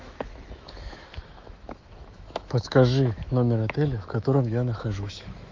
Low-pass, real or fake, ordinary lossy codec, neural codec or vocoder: 7.2 kHz; fake; Opus, 24 kbps; autoencoder, 48 kHz, 128 numbers a frame, DAC-VAE, trained on Japanese speech